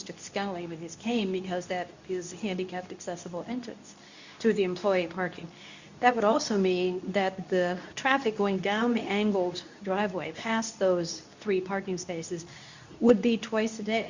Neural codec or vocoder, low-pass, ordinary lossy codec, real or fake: codec, 24 kHz, 0.9 kbps, WavTokenizer, medium speech release version 2; 7.2 kHz; Opus, 64 kbps; fake